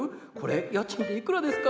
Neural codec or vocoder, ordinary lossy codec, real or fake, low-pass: none; none; real; none